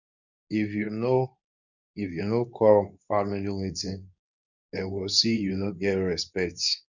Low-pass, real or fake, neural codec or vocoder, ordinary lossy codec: 7.2 kHz; fake; codec, 24 kHz, 0.9 kbps, WavTokenizer, medium speech release version 2; none